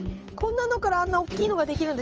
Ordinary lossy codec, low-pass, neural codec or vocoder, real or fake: Opus, 24 kbps; 7.2 kHz; codec, 16 kHz, 8 kbps, FunCodec, trained on Chinese and English, 25 frames a second; fake